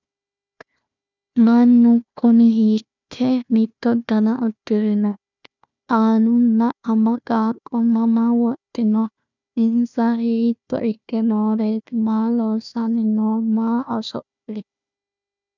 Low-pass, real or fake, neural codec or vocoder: 7.2 kHz; fake; codec, 16 kHz, 1 kbps, FunCodec, trained on Chinese and English, 50 frames a second